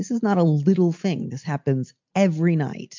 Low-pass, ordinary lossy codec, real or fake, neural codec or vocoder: 7.2 kHz; MP3, 64 kbps; real; none